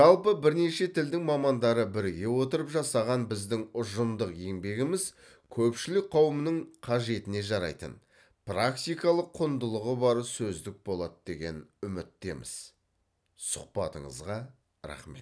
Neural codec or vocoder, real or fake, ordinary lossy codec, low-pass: none; real; none; none